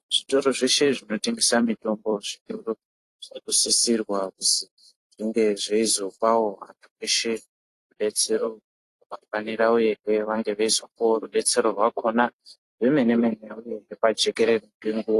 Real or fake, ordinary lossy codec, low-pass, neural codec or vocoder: fake; AAC, 48 kbps; 14.4 kHz; vocoder, 44.1 kHz, 128 mel bands every 256 samples, BigVGAN v2